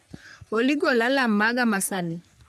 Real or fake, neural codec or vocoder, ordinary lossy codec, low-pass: fake; codec, 44.1 kHz, 3.4 kbps, Pupu-Codec; none; 14.4 kHz